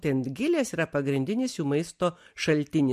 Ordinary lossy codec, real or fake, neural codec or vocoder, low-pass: MP3, 64 kbps; real; none; 14.4 kHz